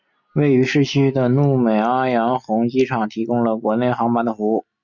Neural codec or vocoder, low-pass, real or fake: none; 7.2 kHz; real